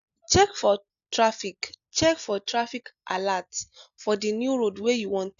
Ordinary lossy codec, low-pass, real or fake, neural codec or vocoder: AAC, 96 kbps; 7.2 kHz; real; none